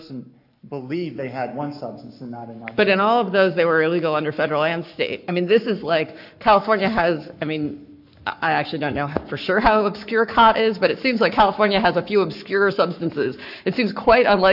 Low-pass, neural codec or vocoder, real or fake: 5.4 kHz; codec, 44.1 kHz, 7.8 kbps, Pupu-Codec; fake